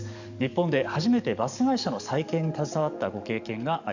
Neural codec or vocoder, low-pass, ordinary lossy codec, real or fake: codec, 44.1 kHz, 7.8 kbps, DAC; 7.2 kHz; none; fake